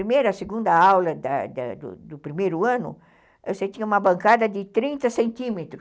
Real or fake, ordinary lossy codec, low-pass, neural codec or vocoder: real; none; none; none